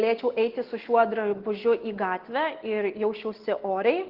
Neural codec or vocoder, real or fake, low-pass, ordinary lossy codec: none; real; 5.4 kHz; Opus, 16 kbps